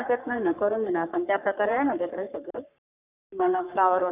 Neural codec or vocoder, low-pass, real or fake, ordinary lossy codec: codec, 44.1 kHz, 3.4 kbps, Pupu-Codec; 3.6 kHz; fake; none